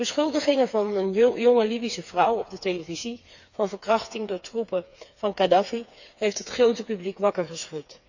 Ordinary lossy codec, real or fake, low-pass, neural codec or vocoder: none; fake; 7.2 kHz; codec, 16 kHz, 4 kbps, FreqCodec, smaller model